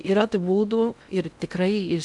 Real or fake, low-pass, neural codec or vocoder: fake; 10.8 kHz; codec, 16 kHz in and 24 kHz out, 0.6 kbps, FocalCodec, streaming, 2048 codes